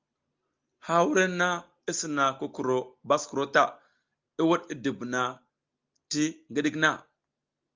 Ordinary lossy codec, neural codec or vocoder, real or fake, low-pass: Opus, 24 kbps; none; real; 7.2 kHz